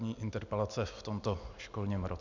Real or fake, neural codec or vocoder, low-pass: real; none; 7.2 kHz